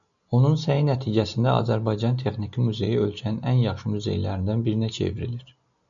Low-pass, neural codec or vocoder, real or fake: 7.2 kHz; none; real